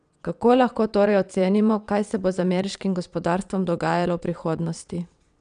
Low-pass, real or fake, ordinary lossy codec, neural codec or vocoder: 9.9 kHz; fake; none; vocoder, 22.05 kHz, 80 mel bands, WaveNeXt